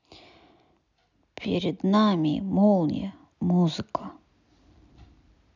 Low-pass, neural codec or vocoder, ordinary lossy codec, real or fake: 7.2 kHz; none; none; real